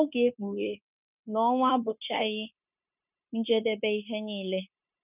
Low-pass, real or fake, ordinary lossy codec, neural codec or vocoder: 3.6 kHz; fake; none; codec, 16 kHz, 0.9 kbps, LongCat-Audio-Codec